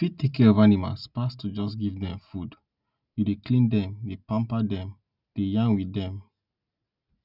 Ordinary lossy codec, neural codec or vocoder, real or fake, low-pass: none; none; real; 5.4 kHz